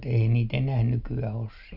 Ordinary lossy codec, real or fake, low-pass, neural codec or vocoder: none; real; 5.4 kHz; none